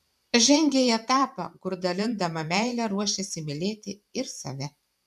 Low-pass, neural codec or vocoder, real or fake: 14.4 kHz; vocoder, 44.1 kHz, 128 mel bands every 512 samples, BigVGAN v2; fake